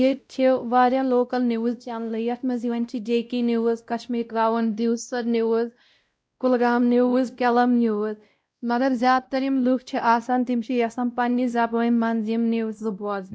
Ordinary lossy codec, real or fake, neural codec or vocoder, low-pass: none; fake; codec, 16 kHz, 0.5 kbps, X-Codec, WavLM features, trained on Multilingual LibriSpeech; none